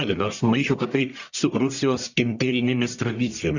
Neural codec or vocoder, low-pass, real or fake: codec, 44.1 kHz, 1.7 kbps, Pupu-Codec; 7.2 kHz; fake